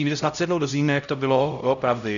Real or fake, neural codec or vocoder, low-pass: fake; codec, 16 kHz, 0.5 kbps, X-Codec, HuBERT features, trained on LibriSpeech; 7.2 kHz